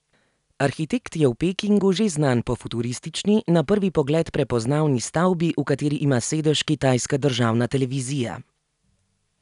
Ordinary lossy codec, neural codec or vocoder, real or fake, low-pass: none; none; real; 10.8 kHz